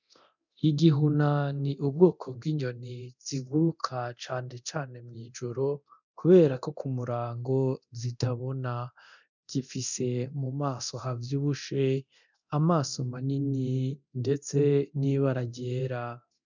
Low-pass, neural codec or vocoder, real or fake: 7.2 kHz; codec, 24 kHz, 0.9 kbps, DualCodec; fake